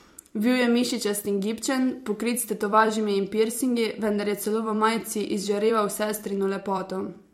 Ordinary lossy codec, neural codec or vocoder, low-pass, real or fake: MP3, 64 kbps; vocoder, 44.1 kHz, 128 mel bands every 256 samples, BigVGAN v2; 19.8 kHz; fake